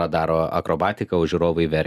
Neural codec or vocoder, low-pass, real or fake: none; 14.4 kHz; real